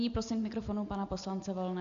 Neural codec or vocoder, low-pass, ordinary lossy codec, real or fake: none; 7.2 kHz; AAC, 64 kbps; real